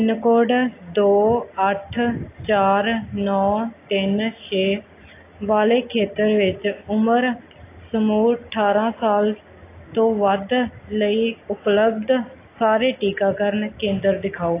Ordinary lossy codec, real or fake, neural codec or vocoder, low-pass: AAC, 24 kbps; real; none; 3.6 kHz